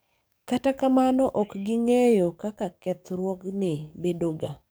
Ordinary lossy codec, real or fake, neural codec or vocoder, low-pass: none; fake; codec, 44.1 kHz, 7.8 kbps, DAC; none